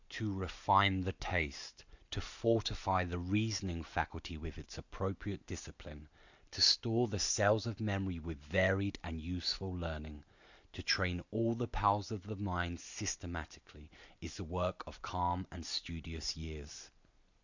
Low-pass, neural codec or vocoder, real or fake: 7.2 kHz; none; real